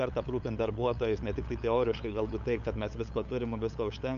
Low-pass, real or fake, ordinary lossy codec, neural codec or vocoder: 7.2 kHz; fake; MP3, 96 kbps; codec, 16 kHz, 8 kbps, FunCodec, trained on LibriTTS, 25 frames a second